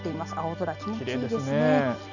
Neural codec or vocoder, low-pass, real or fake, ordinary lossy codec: none; 7.2 kHz; real; none